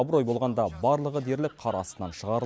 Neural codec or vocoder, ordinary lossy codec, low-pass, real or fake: none; none; none; real